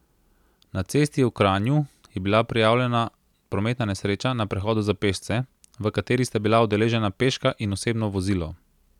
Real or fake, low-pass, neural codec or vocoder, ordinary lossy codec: real; 19.8 kHz; none; none